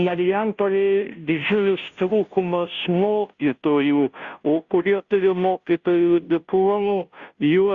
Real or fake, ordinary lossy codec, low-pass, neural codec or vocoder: fake; Opus, 64 kbps; 7.2 kHz; codec, 16 kHz, 0.5 kbps, FunCodec, trained on Chinese and English, 25 frames a second